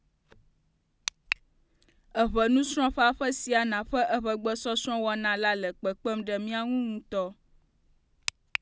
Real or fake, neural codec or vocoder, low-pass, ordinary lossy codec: real; none; none; none